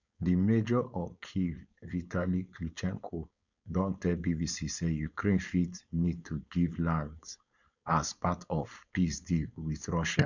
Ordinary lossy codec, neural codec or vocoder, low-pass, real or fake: none; codec, 16 kHz, 4.8 kbps, FACodec; 7.2 kHz; fake